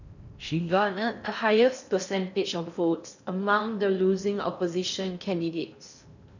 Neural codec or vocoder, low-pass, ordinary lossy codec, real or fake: codec, 16 kHz in and 24 kHz out, 0.6 kbps, FocalCodec, streaming, 4096 codes; 7.2 kHz; none; fake